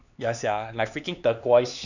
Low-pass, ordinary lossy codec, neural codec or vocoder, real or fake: 7.2 kHz; none; codec, 16 kHz, 4 kbps, X-Codec, HuBERT features, trained on LibriSpeech; fake